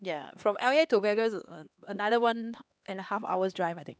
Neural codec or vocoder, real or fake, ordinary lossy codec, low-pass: codec, 16 kHz, 2 kbps, X-Codec, HuBERT features, trained on LibriSpeech; fake; none; none